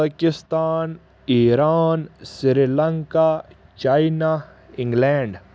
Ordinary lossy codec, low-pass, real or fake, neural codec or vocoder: none; none; real; none